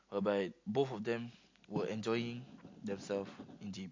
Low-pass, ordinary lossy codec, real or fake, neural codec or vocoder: 7.2 kHz; MP3, 48 kbps; real; none